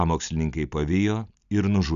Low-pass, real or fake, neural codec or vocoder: 7.2 kHz; real; none